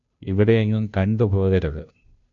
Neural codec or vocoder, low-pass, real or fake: codec, 16 kHz, 0.5 kbps, FunCodec, trained on Chinese and English, 25 frames a second; 7.2 kHz; fake